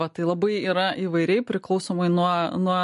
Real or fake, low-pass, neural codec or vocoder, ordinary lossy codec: real; 14.4 kHz; none; MP3, 48 kbps